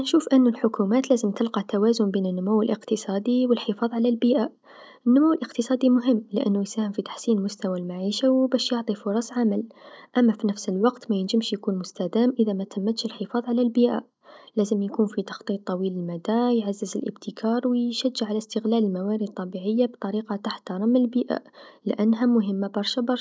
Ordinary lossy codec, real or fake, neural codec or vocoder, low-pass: none; real; none; none